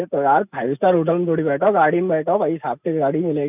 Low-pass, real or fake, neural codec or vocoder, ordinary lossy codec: 3.6 kHz; real; none; none